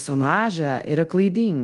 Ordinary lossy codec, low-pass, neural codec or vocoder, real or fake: Opus, 32 kbps; 10.8 kHz; codec, 24 kHz, 0.5 kbps, DualCodec; fake